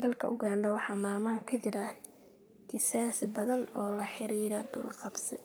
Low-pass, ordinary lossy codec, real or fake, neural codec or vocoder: none; none; fake; codec, 44.1 kHz, 3.4 kbps, Pupu-Codec